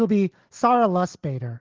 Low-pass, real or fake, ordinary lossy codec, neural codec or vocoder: 7.2 kHz; real; Opus, 16 kbps; none